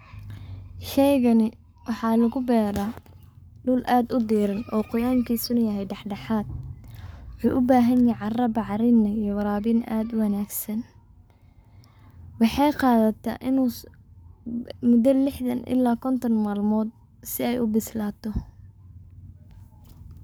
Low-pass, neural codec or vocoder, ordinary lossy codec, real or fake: none; codec, 44.1 kHz, 7.8 kbps, Pupu-Codec; none; fake